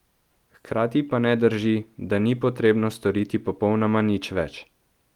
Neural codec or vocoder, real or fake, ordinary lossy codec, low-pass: none; real; Opus, 24 kbps; 19.8 kHz